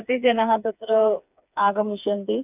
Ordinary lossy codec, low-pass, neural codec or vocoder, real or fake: none; 3.6 kHz; codec, 16 kHz, 4 kbps, FreqCodec, smaller model; fake